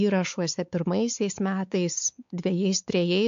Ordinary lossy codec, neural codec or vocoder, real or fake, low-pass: AAC, 64 kbps; codec, 16 kHz, 4 kbps, X-Codec, WavLM features, trained on Multilingual LibriSpeech; fake; 7.2 kHz